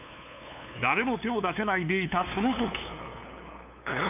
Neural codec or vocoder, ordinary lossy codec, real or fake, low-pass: codec, 16 kHz, 2 kbps, FunCodec, trained on LibriTTS, 25 frames a second; none; fake; 3.6 kHz